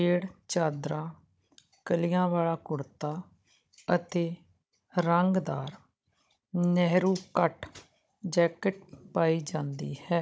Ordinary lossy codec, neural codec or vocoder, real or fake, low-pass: none; codec, 16 kHz, 16 kbps, FunCodec, trained on Chinese and English, 50 frames a second; fake; none